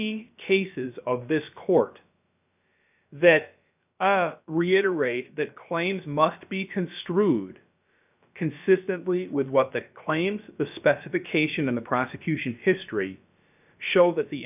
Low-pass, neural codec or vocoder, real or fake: 3.6 kHz; codec, 16 kHz, about 1 kbps, DyCAST, with the encoder's durations; fake